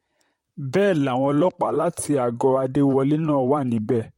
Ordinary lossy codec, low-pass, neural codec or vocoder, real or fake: MP3, 64 kbps; 19.8 kHz; vocoder, 44.1 kHz, 128 mel bands, Pupu-Vocoder; fake